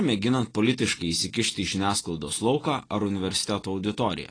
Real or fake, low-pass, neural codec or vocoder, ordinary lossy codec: real; 9.9 kHz; none; AAC, 32 kbps